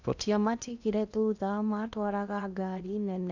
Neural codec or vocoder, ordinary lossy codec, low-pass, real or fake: codec, 16 kHz in and 24 kHz out, 0.8 kbps, FocalCodec, streaming, 65536 codes; none; 7.2 kHz; fake